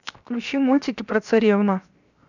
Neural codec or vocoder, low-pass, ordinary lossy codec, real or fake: codec, 16 kHz, 0.7 kbps, FocalCodec; 7.2 kHz; none; fake